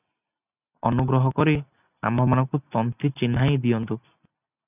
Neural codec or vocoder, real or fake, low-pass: none; real; 3.6 kHz